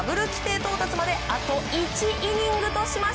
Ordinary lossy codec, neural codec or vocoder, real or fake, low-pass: none; none; real; none